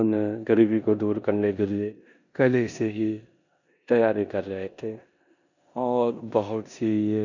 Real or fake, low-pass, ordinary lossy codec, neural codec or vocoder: fake; 7.2 kHz; none; codec, 16 kHz in and 24 kHz out, 0.9 kbps, LongCat-Audio-Codec, four codebook decoder